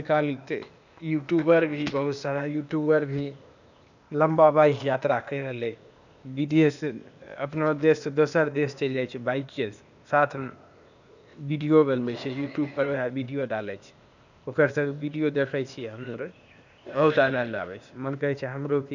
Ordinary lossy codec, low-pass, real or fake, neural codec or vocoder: none; 7.2 kHz; fake; codec, 16 kHz, 0.8 kbps, ZipCodec